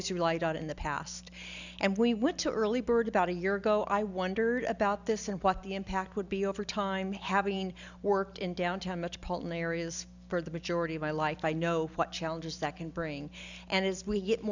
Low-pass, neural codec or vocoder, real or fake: 7.2 kHz; none; real